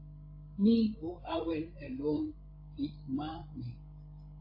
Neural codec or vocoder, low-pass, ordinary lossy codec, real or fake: codec, 16 kHz, 16 kbps, FreqCodec, larger model; 5.4 kHz; AAC, 32 kbps; fake